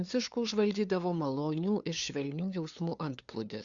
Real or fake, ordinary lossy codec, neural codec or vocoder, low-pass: fake; Opus, 64 kbps; codec, 16 kHz, 2 kbps, FunCodec, trained on LibriTTS, 25 frames a second; 7.2 kHz